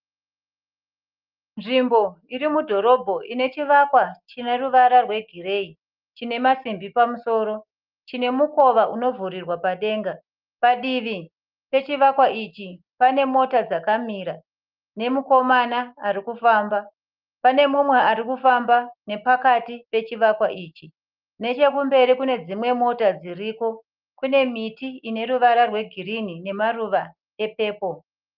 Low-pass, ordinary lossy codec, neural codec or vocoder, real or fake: 5.4 kHz; Opus, 24 kbps; none; real